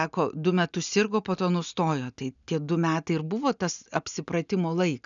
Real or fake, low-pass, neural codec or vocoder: real; 7.2 kHz; none